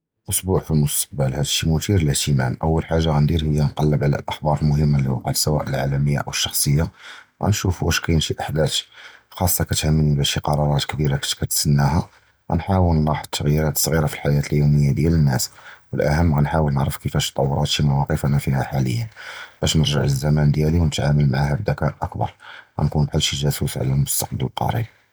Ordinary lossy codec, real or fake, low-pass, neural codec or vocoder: none; real; none; none